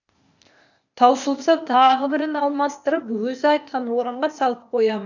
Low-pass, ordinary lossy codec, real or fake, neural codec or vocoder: 7.2 kHz; none; fake; codec, 16 kHz, 0.8 kbps, ZipCodec